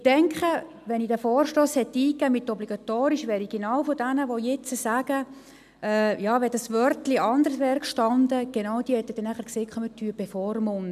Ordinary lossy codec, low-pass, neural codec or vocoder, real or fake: none; 14.4 kHz; none; real